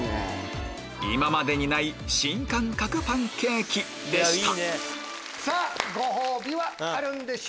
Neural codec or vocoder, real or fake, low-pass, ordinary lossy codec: none; real; none; none